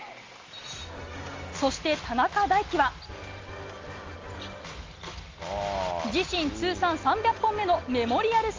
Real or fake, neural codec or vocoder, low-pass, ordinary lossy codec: real; none; 7.2 kHz; Opus, 32 kbps